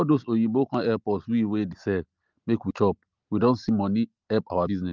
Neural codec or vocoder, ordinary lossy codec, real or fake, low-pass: none; Opus, 32 kbps; real; 7.2 kHz